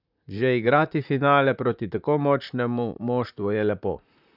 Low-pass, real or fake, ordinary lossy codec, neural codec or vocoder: 5.4 kHz; fake; none; vocoder, 44.1 kHz, 128 mel bands, Pupu-Vocoder